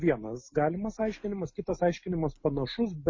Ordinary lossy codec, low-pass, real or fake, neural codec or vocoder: MP3, 32 kbps; 7.2 kHz; real; none